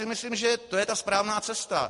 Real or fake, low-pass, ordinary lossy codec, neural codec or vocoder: real; 14.4 kHz; MP3, 48 kbps; none